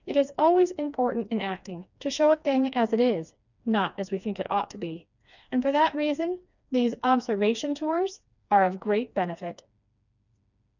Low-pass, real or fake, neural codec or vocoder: 7.2 kHz; fake; codec, 16 kHz, 2 kbps, FreqCodec, smaller model